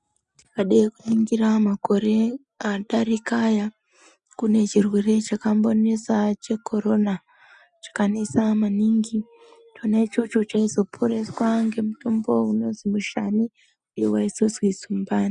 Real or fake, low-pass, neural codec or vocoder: real; 9.9 kHz; none